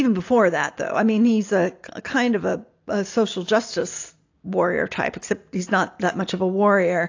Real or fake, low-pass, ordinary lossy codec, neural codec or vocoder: real; 7.2 kHz; AAC, 48 kbps; none